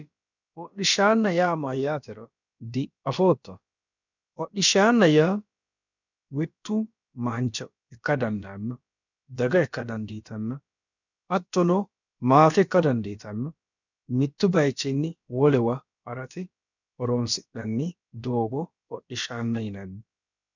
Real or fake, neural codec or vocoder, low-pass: fake; codec, 16 kHz, about 1 kbps, DyCAST, with the encoder's durations; 7.2 kHz